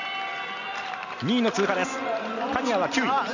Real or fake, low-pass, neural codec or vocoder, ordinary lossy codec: real; 7.2 kHz; none; none